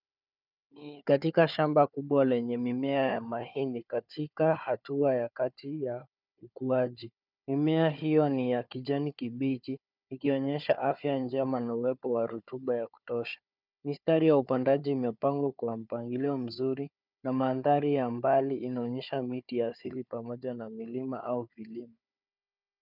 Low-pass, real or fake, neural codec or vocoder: 5.4 kHz; fake; codec, 16 kHz, 4 kbps, FunCodec, trained on Chinese and English, 50 frames a second